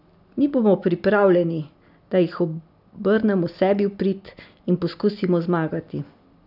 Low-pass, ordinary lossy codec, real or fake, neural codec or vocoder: 5.4 kHz; none; real; none